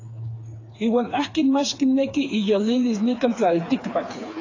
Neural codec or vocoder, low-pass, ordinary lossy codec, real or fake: codec, 16 kHz, 4 kbps, FreqCodec, smaller model; 7.2 kHz; AAC, 32 kbps; fake